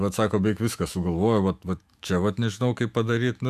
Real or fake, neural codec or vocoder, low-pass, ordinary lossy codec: fake; autoencoder, 48 kHz, 128 numbers a frame, DAC-VAE, trained on Japanese speech; 14.4 kHz; AAC, 96 kbps